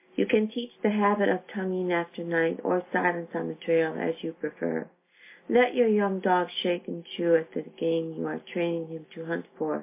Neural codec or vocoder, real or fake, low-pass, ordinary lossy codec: none; real; 3.6 kHz; MP3, 24 kbps